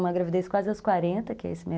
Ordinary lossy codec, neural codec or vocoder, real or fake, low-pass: none; none; real; none